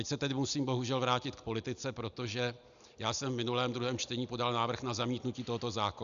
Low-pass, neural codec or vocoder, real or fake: 7.2 kHz; none; real